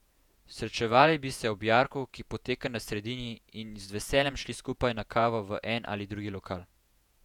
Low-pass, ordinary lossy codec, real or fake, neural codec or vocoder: 19.8 kHz; none; fake; vocoder, 48 kHz, 128 mel bands, Vocos